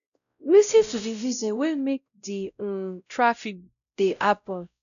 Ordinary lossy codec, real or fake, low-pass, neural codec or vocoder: none; fake; 7.2 kHz; codec, 16 kHz, 0.5 kbps, X-Codec, WavLM features, trained on Multilingual LibriSpeech